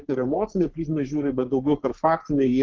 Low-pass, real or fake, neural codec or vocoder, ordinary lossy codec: 7.2 kHz; fake; codec, 44.1 kHz, 3.4 kbps, Pupu-Codec; Opus, 16 kbps